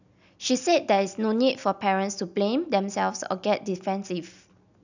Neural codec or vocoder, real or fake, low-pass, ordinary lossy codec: none; real; 7.2 kHz; none